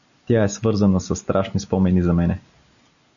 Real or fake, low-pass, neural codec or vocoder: real; 7.2 kHz; none